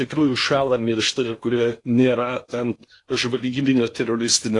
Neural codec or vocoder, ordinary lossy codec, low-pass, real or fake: codec, 16 kHz in and 24 kHz out, 0.8 kbps, FocalCodec, streaming, 65536 codes; AAC, 48 kbps; 10.8 kHz; fake